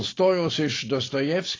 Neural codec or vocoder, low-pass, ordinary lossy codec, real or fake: none; 7.2 kHz; AAC, 32 kbps; real